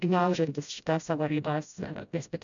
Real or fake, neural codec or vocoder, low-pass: fake; codec, 16 kHz, 0.5 kbps, FreqCodec, smaller model; 7.2 kHz